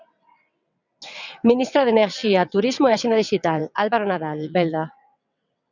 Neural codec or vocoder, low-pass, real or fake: vocoder, 22.05 kHz, 80 mel bands, WaveNeXt; 7.2 kHz; fake